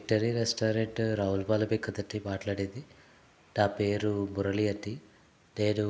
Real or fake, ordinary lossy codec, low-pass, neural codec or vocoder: real; none; none; none